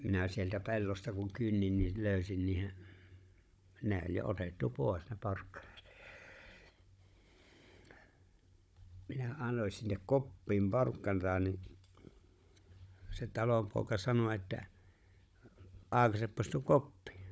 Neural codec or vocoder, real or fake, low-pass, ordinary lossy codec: codec, 16 kHz, 16 kbps, FunCodec, trained on Chinese and English, 50 frames a second; fake; none; none